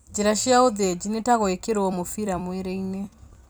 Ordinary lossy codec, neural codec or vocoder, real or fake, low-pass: none; vocoder, 44.1 kHz, 128 mel bands every 256 samples, BigVGAN v2; fake; none